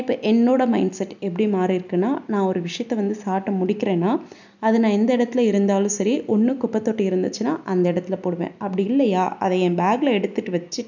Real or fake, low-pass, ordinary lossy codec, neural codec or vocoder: real; 7.2 kHz; none; none